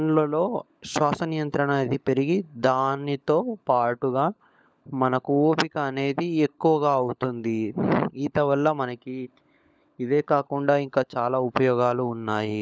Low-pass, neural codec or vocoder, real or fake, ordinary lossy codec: none; codec, 16 kHz, 16 kbps, FunCodec, trained on LibriTTS, 50 frames a second; fake; none